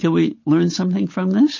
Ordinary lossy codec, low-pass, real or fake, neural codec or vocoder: MP3, 32 kbps; 7.2 kHz; fake; codec, 16 kHz, 8 kbps, FunCodec, trained on Chinese and English, 25 frames a second